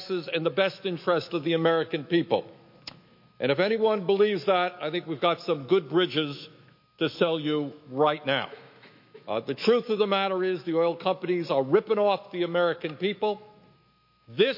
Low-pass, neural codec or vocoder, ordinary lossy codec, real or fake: 5.4 kHz; autoencoder, 48 kHz, 128 numbers a frame, DAC-VAE, trained on Japanese speech; MP3, 32 kbps; fake